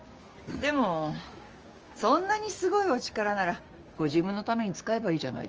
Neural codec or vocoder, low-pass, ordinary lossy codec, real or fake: codec, 16 kHz, 6 kbps, DAC; 7.2 kHz; Opus, 24 kbps; fake